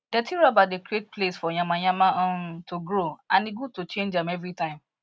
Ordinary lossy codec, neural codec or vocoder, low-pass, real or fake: none; none; none; real